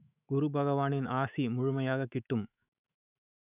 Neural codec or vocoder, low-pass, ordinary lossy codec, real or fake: autoencoder, 48 kHz, 128 numbers a frame, DAC-VAE, trained on Japanese speech; 3.6 kHz; none; fake